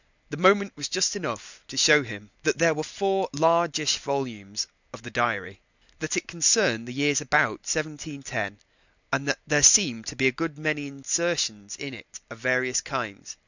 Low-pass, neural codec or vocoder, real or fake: 7.2 kHz; none; real